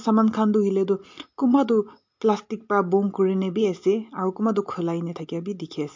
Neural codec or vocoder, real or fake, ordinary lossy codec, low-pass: none; real; MP3, 48 kbps; 7.2 kHz